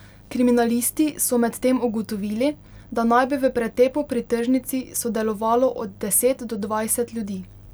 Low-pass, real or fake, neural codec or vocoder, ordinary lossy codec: none; real; none; none